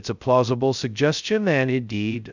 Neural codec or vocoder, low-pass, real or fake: codec, 16 kHz, 0.2 kbps, FocalCodec; 7.2 kHz; fake